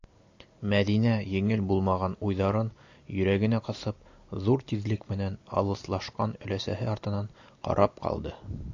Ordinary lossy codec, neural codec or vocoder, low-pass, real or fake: AAC, 48 kbps; none; 7.2 kHz; real